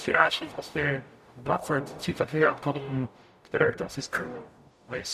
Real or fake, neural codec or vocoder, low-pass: fake; codec, 44.1 kHz, 0.9 kbps, DAC; 14.4 kHz